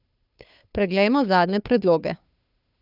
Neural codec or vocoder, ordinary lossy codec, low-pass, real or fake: codec, 16 kHz, 2 kbps, FunCodec, trained on Chinese and English, 25 frames a second; none; 5.4 kHz; fake